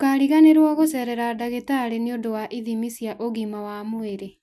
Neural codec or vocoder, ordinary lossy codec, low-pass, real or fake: none; none; none; real